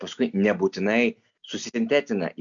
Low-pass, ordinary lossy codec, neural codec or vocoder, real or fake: 7.2 kHz; MP3, 96 kbps; none; real